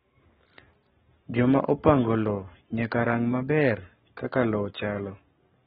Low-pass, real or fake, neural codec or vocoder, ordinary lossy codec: 19.8 kHz; fake; codec, 44.1 kHz, 7.8 kbps, Pupu-Codec; AAC, 16 kbps